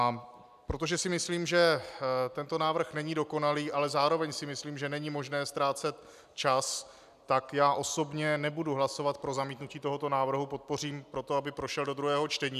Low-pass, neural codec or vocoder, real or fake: 14.4 kHz; none; real